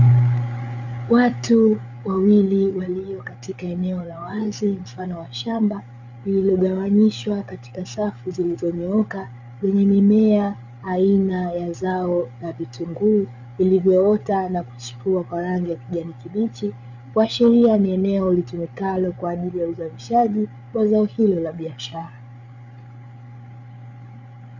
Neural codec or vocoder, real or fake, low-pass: codec, 16 kHz, 8 kbps, FreqCodec, larger model; fake; 7.2 kHz